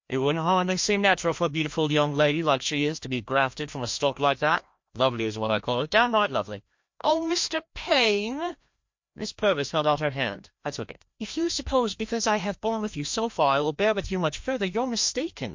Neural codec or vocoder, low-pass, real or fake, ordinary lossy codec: codec, 16 kHz, 1 kbps, FreqCodec, larger model; 7.2 kHz; fake; MP3, 48 kbps